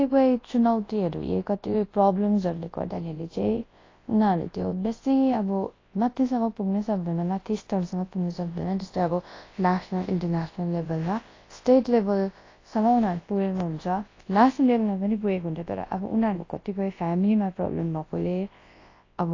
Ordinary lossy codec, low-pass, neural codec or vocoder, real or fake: AAC, 32 kbps; 7.2 kHz; codec, 24 kHz, 0.9 kbps, WavTokenizer, large speech release; fake